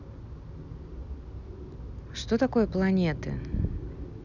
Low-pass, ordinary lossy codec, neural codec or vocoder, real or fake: 7.2 kHz; none; none; real